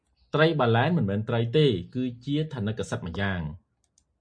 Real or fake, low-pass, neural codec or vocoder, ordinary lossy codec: real; 9.9 kHz; none; MP3, 48 kbps